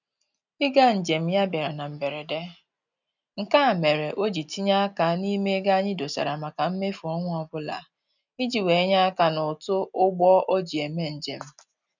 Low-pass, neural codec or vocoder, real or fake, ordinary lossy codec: 7.2 kHz; none; real; none